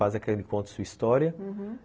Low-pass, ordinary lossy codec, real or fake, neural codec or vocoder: none; none; real; none